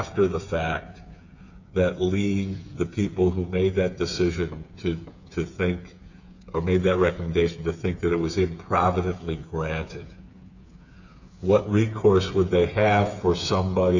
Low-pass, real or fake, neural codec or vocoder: 7.2 kHz; fake; codec, 16 kHz, 8 kbps, FreqCodec, smaller model